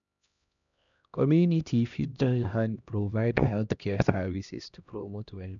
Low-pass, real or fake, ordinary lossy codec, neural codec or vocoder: 7.2 kHz; fake; none; codec, 16 kHz, 1 kbps, X-Codec, HuBERT features, trained on LibriSpeech